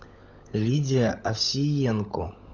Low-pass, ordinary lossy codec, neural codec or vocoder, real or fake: 7.2 kHz; Opus, 64 kbps; codec, 16 kHz, 16 kbps, FunCodec, trained on LibriTTS, 50 frames a second; fake